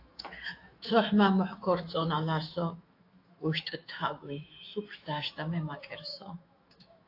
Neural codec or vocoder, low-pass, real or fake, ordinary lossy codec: autoencoder, 48 kHz, 128 numbers a frame, DAC-VAE, trained on Japanese speech; 5.4 kHz; fake; AAC, 32 kbps